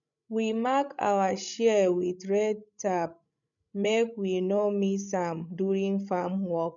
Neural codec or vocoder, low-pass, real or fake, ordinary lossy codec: codec, 16 kHz, 16 kbps, FreqCodec, larger model; 7.2 kHz; fake; none